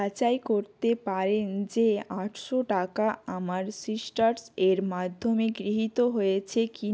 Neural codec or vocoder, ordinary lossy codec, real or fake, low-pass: none; none; real; none